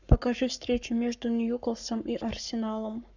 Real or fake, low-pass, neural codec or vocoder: fake; 7.2 kHz; codec, 44.1 kHz, 7.8 kbps, Pupu-Codec